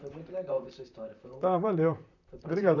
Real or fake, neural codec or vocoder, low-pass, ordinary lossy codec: fake; vocoder, 44.1 kHz, 128 mel bands, Pupu-Vocoder; 7.2 kHz; none